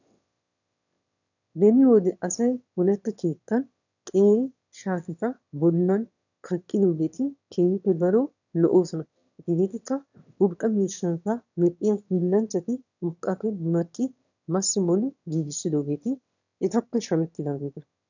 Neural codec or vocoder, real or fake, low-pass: autoencoder, 22.05 kHz, a latent of 192 numbers a frame, VITS, trained on one speaker; fake; 7.2 kHz